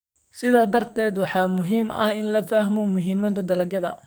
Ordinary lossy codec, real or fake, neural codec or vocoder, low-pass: none; fake; codec, 44.1 kHz, 2.6 kbps, SNAC; none